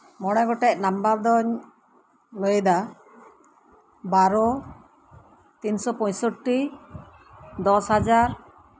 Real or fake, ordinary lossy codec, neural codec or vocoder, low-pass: real; none; none; none